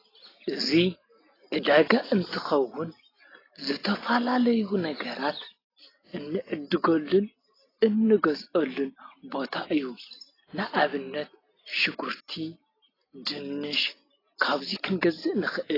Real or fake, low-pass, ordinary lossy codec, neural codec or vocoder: real; 5.4 kHz; AAC, 24 kbps; none